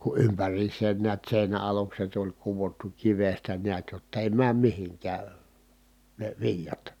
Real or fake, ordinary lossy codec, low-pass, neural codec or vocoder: real; none; 19.8 kHz; none